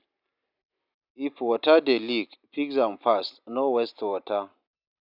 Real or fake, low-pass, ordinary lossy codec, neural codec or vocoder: real; 5.4 kHz; none; none